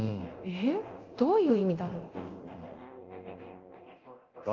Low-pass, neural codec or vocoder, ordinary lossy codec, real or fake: 7.2 kHz; codec, 24 kHz, 0.9 kbps, DualCodec; Opus, 24 kbps; fake